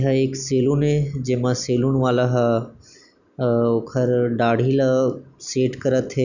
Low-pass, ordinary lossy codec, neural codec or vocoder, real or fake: 7.2 kHz; none; none; real